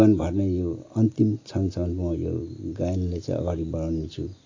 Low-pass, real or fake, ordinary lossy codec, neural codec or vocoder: 7.2 kHz; real; AAC, 48 kbps; none